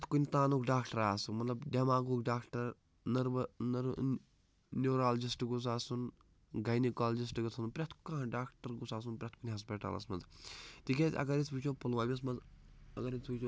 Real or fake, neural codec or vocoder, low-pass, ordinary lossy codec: real; none; none; none